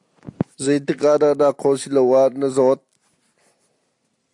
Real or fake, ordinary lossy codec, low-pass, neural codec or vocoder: real; AAC, 64 kbps; 10.8 kHz; none